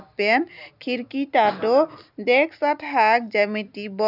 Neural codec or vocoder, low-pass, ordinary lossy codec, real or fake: none; 5.4 kHz; none; real